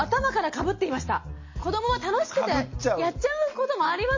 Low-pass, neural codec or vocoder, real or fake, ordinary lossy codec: 7.2 kHz; none; real; MP3, 32 kbps